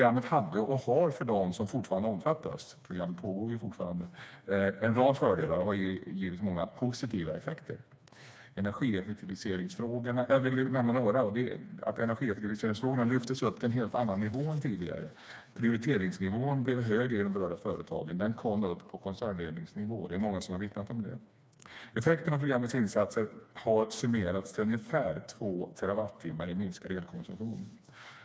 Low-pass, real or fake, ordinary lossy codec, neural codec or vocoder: none; fake; none; codec, 16 kHz, 2 kbps, FreqCodec, smaller model